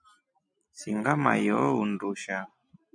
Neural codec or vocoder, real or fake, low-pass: none; real; 9.9 kHz